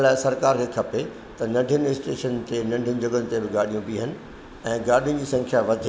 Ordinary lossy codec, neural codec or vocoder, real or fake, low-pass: none; none; real; none